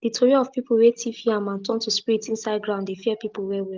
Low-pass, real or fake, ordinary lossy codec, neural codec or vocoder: 7.2 kHz; real; Opus, 32 kbps; none